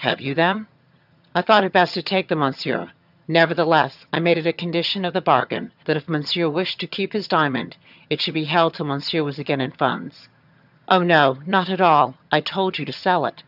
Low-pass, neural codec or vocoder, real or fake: 5.4 kHz; vocoder, 22.05 kHz, 80 mel bands, HiFi-GAN; fake